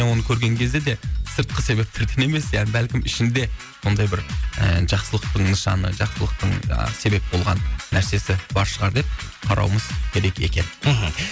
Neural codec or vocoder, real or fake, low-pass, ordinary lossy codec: none; real; none; none